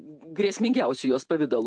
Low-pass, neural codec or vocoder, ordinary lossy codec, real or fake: 9.9 kHz; vocoder, 48 kHz, 128 mel bands, Vocos; AAC, 64 kbps; fake